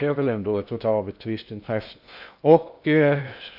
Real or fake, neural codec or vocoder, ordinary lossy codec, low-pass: fake; codec, 16 kHz in and 24 kHz out, 0.6 kbps, FocalCodec, streaming, 2048 codes; AAC, 48 kbps; 5.4 kHz